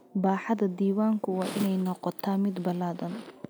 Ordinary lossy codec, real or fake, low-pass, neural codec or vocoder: none; real; none; none